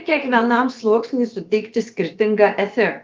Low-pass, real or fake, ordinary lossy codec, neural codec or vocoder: 7.2 kHz; fake; Opus, 32 kbps; codec, 16 kHz, about 1 kbps, DyCAST, with the encoder's durations